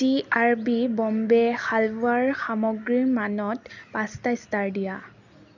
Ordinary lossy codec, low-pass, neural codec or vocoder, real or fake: none; 7.2 kHz; none; real